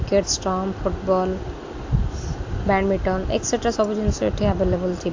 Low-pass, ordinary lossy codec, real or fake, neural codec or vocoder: 7.2 kHz; AAC, 48 kbps; real; none